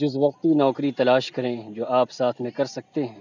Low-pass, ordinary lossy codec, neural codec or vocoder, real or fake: 7.2 kHz; none; none; real